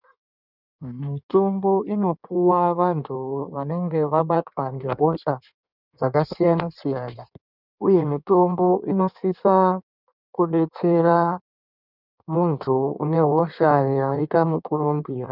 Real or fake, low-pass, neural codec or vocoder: fake; 5.4 kHz; codec, 16 kHz in and 24 kHz out, 1.1 kbps, FireRedTTS-2 codec